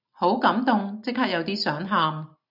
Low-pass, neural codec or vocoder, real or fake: 5.4 kHz; none; real